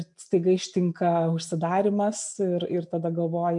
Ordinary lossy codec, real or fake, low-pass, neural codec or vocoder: MP3, 96 kbps; real; 10.8 kHz; none